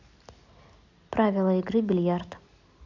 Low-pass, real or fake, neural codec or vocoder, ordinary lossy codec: 7.2 kHz; real; none; none